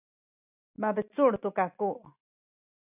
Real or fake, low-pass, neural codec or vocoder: real; 3.6 kHz; none